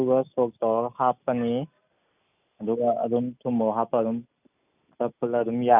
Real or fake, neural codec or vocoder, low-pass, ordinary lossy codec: real; none; 3.6 kHz; none